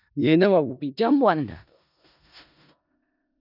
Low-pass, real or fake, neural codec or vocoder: 5.4 kHz; fake; codec, 16 kHz in and 24 kHz out, 0.4 kbps, LongCat-Audio-Codec, four codebook decoder